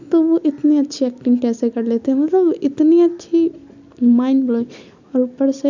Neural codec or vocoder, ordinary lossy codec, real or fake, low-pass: none; none; real; 7.2 kHz